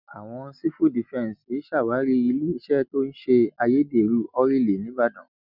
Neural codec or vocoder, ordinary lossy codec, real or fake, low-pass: none; none; real; 5.4 kHz